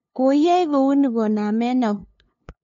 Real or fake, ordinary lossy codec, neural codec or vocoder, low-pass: fake; MP3, 48 kbps; codec, 16 kHz, 2 kbps, FunCodec, trained on LibriTTS, 25 frames a second; 7.2 kHz